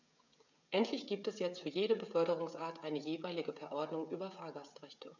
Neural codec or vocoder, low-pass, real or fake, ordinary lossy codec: codec, 16 kHz, 16 kbps, FreqCodec, smaller model; 7.2 kHz; fake; none